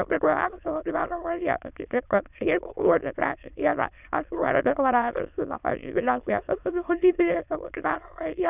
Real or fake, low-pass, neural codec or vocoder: fake; 3.6 kHz; autoencoder, 22.05 kHz, a latent of 192 numbers a frame, VITS, trained on many speakers